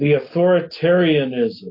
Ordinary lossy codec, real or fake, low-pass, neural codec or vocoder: MP3, 32 kbps; real; 5.4 kHz; none